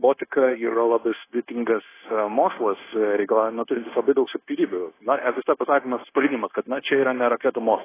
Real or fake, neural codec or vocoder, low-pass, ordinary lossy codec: fake; codec, 16 kHz, 0.9 kbps, LongCat-Audio-Codec; 3.6 kHz; AAC, 16 kbps